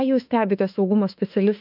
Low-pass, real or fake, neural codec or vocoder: 5.4 kHz; fake; autoencoder, 48 kHz, 32 numbers a frame, DAC-VAE, trained on Japanese speech